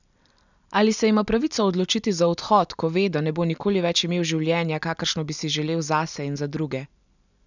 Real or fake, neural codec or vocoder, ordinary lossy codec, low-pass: real; none; none; 7.2 kHz